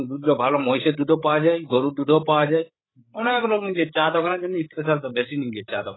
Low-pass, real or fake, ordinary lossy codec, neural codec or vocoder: 7.2 kHz; fake; AAC, 16 kbps; codec, 16 kHz, 8 kbps, FreqCodec, larger model